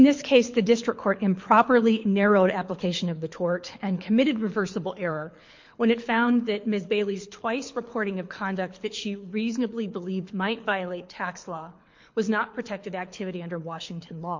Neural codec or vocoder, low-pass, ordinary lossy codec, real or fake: codec, 24 kHz, 6 kbps, HILCodec; 7.2 kHz; MP3, 48 kbps; fake